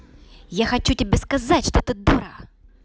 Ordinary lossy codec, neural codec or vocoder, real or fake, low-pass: none; none; real; none